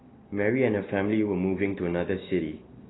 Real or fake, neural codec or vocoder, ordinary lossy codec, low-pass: real; none; AAC, 16 kbps; 7.2 kHz